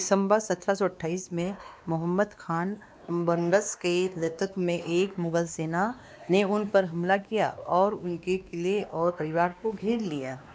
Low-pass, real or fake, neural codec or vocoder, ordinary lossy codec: none; fake; codec, 16 kHz, 2 kbps, X-Codec, WavLM features, trained on Multilingual LibriSpeech; none